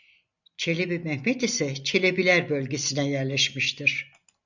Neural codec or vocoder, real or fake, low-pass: none; real; 7.2 kHz